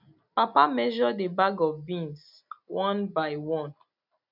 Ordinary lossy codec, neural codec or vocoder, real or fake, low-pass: none; none; real; 5.4 kHz